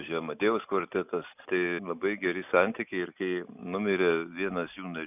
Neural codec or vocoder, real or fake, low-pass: none; real; 3.6 kHz